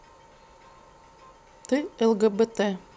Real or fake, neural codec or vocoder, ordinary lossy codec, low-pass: real; none; none; none